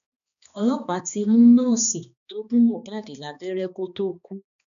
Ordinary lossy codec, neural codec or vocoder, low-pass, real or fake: none; codec, 16 kHz, 2 kbps, X-Codec, HuBERT features, trained on balanced general audio; 7.2 kHz; fake